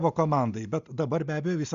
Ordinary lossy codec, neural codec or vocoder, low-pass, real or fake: Opus, 64 kbps; none; 7.2 kHz; real